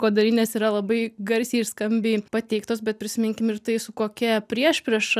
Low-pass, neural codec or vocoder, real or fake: 14.4 kHz; none; real